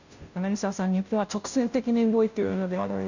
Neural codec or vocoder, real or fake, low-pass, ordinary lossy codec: codec, 16 kHz, 0.5 kbps, FunCodec, trained on Chinese and English, 25 frames a second; fake; 7.2 kHz; none